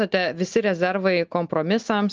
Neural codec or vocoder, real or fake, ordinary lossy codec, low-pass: none; real; Opus, 24 kbps; 7.2 kHz